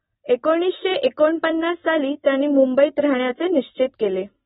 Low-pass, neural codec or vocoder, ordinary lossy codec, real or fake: 10.8 kHz; none; AAC, 16 kbps; real